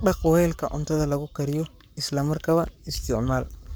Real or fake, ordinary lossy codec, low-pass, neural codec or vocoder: fake; none; none; codec, 44.1 kHz, 7.8 kbps, Pupu-Codec